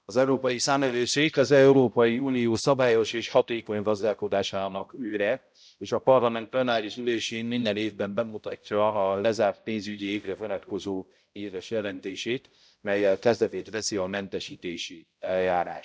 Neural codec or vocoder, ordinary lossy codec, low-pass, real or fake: codec, 16 kHz, 0.5 kbps, X-Codec, HuBERT features, trained on balanced general audio; none; none; fake